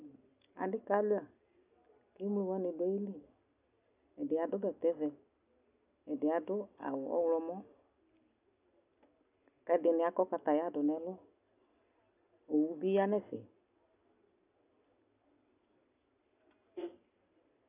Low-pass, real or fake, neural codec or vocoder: 3.6 kHz; real; none